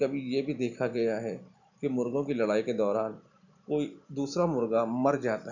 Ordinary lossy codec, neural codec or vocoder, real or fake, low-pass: none; none; real; 7.2 kHz